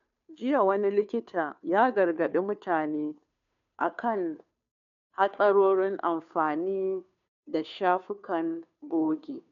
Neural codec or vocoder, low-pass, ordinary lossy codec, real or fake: codec, 16 kHz, 2 kbps, FunCodec, trained on Chinese and English, 25 frames a second; 7.2 kHz; none; fake